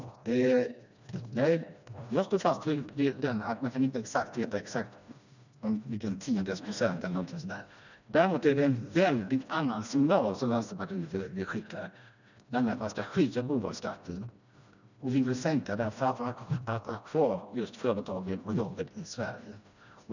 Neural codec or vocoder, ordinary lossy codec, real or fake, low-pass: codec, 16 kHz, 1 kbps, FreqCodec, smaller model; none; fake; 7.2 kHz